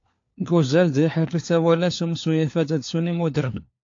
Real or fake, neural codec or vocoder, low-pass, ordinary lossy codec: fake; codec, 16 kHz, 4 kbps, FunCodec, trained on LibriTTS, 50 frames a second; 7.2 kHz; AAC, 48 kbps